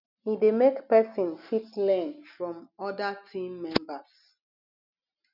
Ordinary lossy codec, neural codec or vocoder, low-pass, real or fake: none; none; 5.4 kHz; real